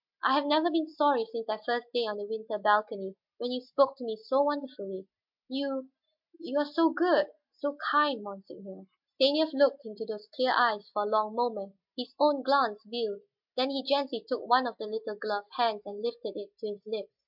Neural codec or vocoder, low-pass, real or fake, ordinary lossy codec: none; 5.4 kHz; real; MP3, 48 kbps